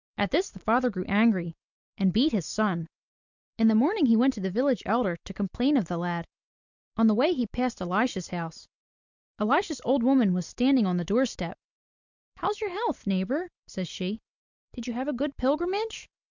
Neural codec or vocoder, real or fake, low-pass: none; real; 7.2 kHz